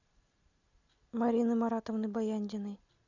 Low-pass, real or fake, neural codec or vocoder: 7.2 kHz; real; none